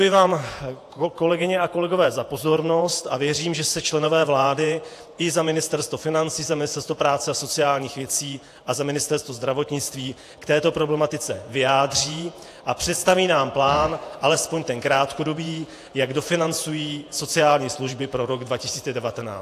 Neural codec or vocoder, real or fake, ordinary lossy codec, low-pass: vocoder, 48 kHz, 128 mel bands, Vocos; fake; AAC, 64 kbps; 14.4 kHz